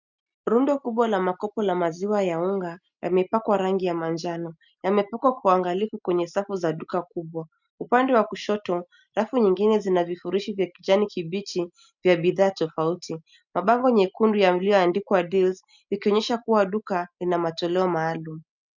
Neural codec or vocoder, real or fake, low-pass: none; real; 7.2 kHz